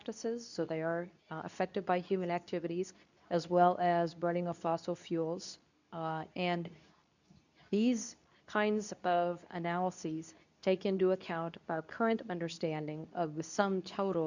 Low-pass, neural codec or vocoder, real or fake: 7.2 kHz; codec, 24 kHz, 0.9 kbps, WavTokenizer, medium speech release version 2; fake